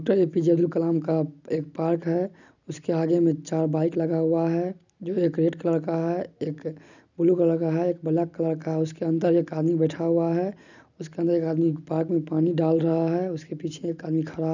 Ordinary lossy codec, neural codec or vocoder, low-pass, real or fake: none; none; 7.2 kHz; real